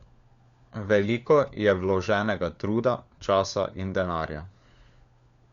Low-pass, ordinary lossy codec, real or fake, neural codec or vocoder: 7.2 kHz; Opus, 64 kbps; fake; codec, 16 kHz, 4 kbps, FunCodec, trained on LibriTTS, 50 frames a second